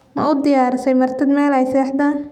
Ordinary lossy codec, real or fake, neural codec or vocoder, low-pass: none; fake; autoencoder, 48 kHz, 128 numbers a frame, DAC-VAE, trained on Japanese speech; 19.8 kHz